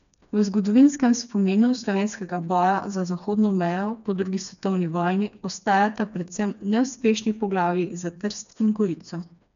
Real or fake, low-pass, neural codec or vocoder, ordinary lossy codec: fake; 7.2 kHz; codec, 16 kHz, 2 kbps, FreqCodec, smaller model; none